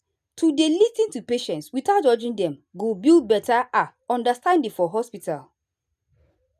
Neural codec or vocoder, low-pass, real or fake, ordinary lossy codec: none; 14.4 kHz; real; none